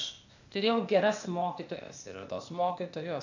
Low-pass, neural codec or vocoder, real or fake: 7.2 kHz; codec, 16 kHz, 0.8 kbps, ZipCodec; fake